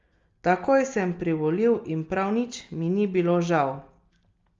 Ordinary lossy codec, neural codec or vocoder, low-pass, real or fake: Opus, 32 kbps; none; 7.2 kHz; real